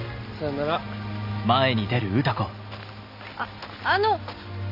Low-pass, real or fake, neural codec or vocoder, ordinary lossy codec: 5.4 kHz; real; none; none